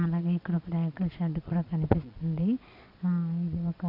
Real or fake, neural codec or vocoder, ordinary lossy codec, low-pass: fake; vocoder, 22.05 kHz, 80 mel bands, Vocos; none; 5.4 kHz